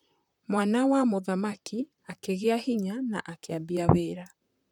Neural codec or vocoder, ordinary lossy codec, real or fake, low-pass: vocoder, 44.1 kHz, 128 mel bands, Pupu-Vocoder; none; fake; 19.8 kHz